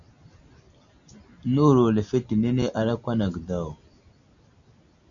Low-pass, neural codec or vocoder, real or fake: 7.2 kHz; none; real